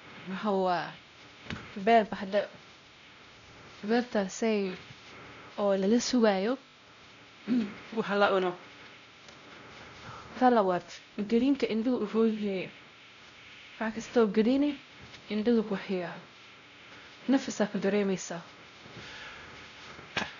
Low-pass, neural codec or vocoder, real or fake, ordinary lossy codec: 7.2 kHz; codec, 16 kHz, 0.5 kbps, X-Codec, WavLM features, trained on Multilingual LibriSpeech; fake; none